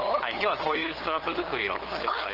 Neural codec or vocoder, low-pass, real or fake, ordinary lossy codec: codec, 16 kHz, 8 kbps, FunCodec, trained on LibriTTS, 25 frames a second; 5.4 kHz; fake; Opus, 16 kbps